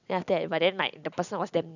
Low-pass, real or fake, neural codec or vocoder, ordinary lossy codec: 7.2 kHz; real; none; none